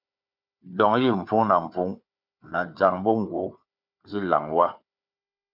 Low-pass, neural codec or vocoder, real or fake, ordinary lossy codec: 5.4 kHz; codec, 16 kHz, 4 kbps, FunCodec, trained on Chinese and English, 50 frames a second; fake; MP3, 48 kbps